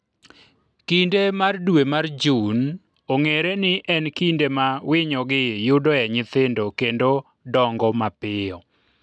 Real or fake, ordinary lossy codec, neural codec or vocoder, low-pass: real; none; none; none